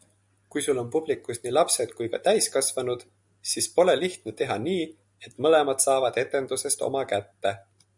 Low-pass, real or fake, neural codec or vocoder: 10.8 kHz; real; none